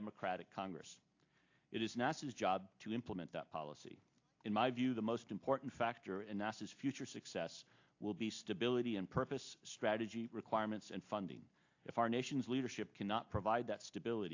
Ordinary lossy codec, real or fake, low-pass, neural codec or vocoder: AAC, 48 kbps; real; 7.2 kHz; none